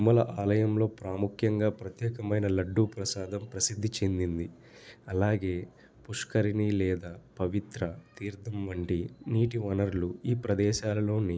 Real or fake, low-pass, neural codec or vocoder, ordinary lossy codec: real; none; none; none